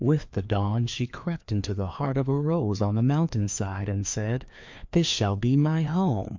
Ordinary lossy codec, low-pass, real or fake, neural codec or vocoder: MP3, 64 kbps; 7.2 kHz; fake; codec, 16 kHz, 2 kbps, FreqCodec, larger model